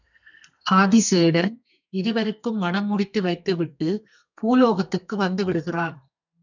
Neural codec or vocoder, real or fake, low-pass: codec, 32 kHz, 1.9 kbps, SNAC; fake; 7.2 kHz